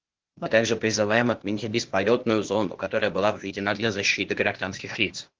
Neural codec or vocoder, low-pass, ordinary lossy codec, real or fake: codec, 16 kHz, 0.8 kbps, ZipCodec; 7.2 kHz; Opus, 16 kbps; fake